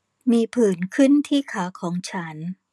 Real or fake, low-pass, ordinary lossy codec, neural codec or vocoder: real; none; none; none